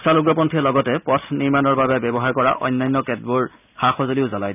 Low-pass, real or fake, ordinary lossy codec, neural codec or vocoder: 3.6 kHz; real; none; none